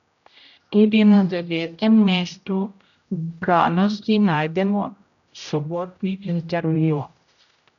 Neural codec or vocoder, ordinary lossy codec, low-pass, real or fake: codec, 16 kHz, 0.5 kbps, X-Codec, HuBERT features, trained on general audio; none; 7.2 kHz; fake